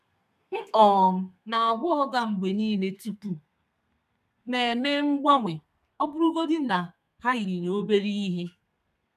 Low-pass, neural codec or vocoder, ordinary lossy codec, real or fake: 14.4 kHz; codec, 32 kHz, 1.9 kbps, SNAC; none; fake